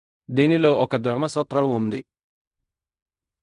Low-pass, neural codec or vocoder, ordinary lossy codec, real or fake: 10.8 kHz; codec, 16 kHz in and 24 kHz out, 0.4 kbps, LongCat-Audio-Codec, fine tuned four codebook decoder; none; fake